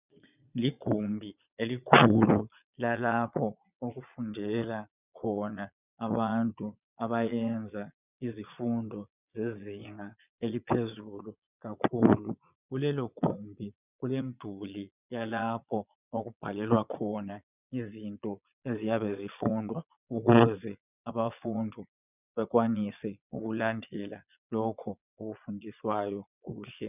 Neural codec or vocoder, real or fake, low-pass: vocoder, 22.05 kHz, 80 mel bands, Vocos; fake; 3.6 kHz